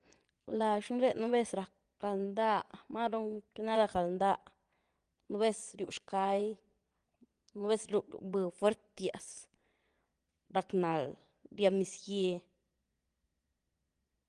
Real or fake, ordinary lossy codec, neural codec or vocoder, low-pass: fake; Opus, 24 kbps; vocoder, 22.05 kHz, 80 mel bands, Vocos; 9.9 kHz